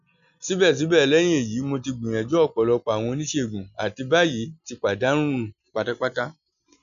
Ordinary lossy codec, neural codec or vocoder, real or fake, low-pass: none; none; real; 7.2 kHz